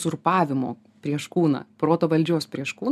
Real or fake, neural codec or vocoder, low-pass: real; none; 14.4 kHz